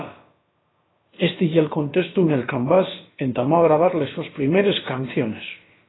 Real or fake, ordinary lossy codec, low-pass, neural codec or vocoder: fake; AAC, 16 kbps; 7.2 kHz; codec, 16 kHz, about 1 kbps, DyCAST, with the encoder's durations